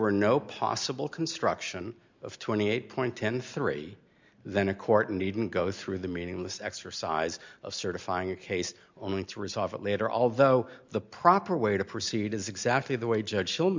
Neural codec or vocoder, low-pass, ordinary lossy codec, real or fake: none; 7.2 kHz; MP3, 64 kbps; real